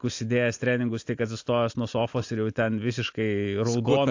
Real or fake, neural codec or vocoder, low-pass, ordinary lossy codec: real; none; 7.2 kHz; AAC, 48 kbps